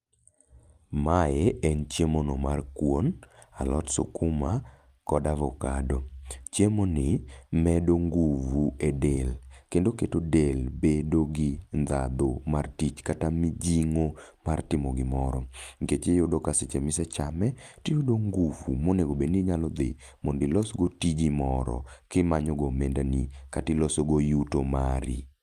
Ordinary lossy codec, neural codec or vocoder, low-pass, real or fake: Opus, 32 kbps; none; 14.4 kHz; real